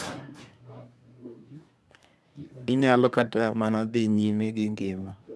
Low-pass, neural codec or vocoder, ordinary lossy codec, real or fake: none; codec, 24 kHz, 1 kbps, SNAC; none; fake